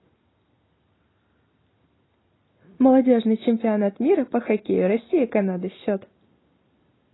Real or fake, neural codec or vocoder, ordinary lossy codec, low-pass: real; none; AAC, 16 kbps; 7.2 kHz